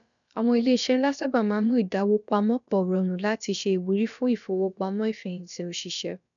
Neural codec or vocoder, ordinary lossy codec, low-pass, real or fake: codec, 16 kHz, about 1 kbps, DyCAST, with the encoder's durations; none; 7.2 kHz; fake